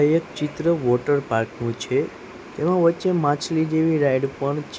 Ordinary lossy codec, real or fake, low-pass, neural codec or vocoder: none; real; none; none